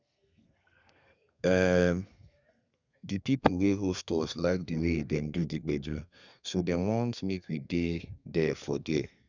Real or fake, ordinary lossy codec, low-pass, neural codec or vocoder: fake; none; 7.2 kHz; codec, 32 kHz, 1.9 kbps, SNAC